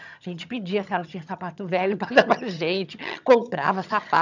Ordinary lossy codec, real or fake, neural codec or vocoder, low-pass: none; fake; vocoder, 22.05 kHz, 80 mel bands, HiFi-GAN; 7.2 kHz